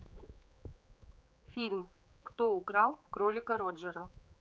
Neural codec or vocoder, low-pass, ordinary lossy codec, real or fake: codec, 16 kHz, 4 kbps, X-Codec, HuBERT features, trained on general audio; none; none; fake